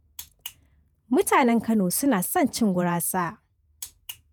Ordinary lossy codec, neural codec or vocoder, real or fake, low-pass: none; vocoder, 48 kHz, 128 mel bands, Vocos; fake; none